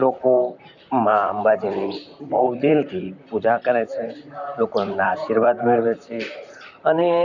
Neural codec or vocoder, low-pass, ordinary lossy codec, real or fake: vocoder, 44.1 kHz, 128 mel bands, Pupu-Vocoder; 7.2 kHz; none; fake